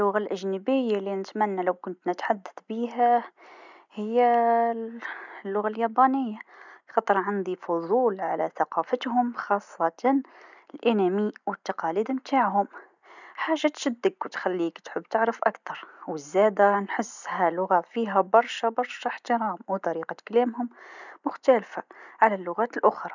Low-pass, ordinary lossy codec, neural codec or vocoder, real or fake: 7.2 kHz; none; none; real